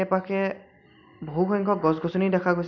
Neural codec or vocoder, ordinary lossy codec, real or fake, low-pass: none; none; real; 7.2 kHz